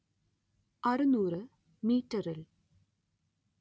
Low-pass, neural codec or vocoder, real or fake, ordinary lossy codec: none; none; real; none